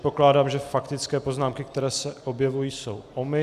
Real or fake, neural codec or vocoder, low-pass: real; none; 14.4 kHz